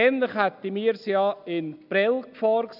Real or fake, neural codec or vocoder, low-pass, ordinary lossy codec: real; none; 5.4 kHz; none